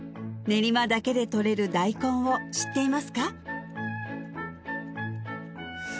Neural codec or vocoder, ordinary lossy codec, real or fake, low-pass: none; none; real; none